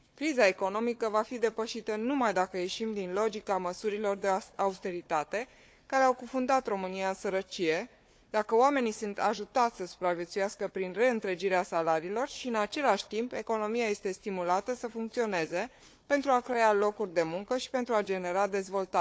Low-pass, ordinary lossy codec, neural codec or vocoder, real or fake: none; none; codec, 16 kHz, 4 kbps, FunCodec, trained on Chinese and English, 50 frames a second; fake